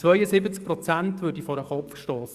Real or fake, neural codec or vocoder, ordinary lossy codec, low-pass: fake; codec, 44.1 kHz, 7.8 kbps, DAC; Opus, 64 kbps; 14.4 kHz